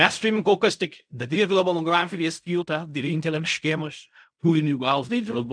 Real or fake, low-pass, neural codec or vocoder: fake; 9.9 kHz; codec, 16 kHz in and 24 kHz out, 0.4 kbps, LongCat-Audio-Codec, fine tuned four codebook decoder